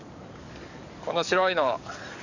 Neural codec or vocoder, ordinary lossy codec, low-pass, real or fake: codec, 44.1 kHz, 7.8 kbps, DAC; none; 7.2 kHz; fake